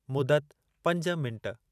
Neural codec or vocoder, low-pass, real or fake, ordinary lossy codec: vocoder, 44.1 kHz, 128 mel bands every 512 samples, BigVGAN v2; 14.4 kHz; fake; none